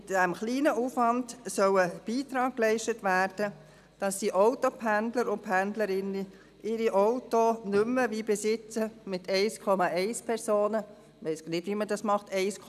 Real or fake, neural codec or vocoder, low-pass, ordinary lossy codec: real; none; 14.4 kHz; none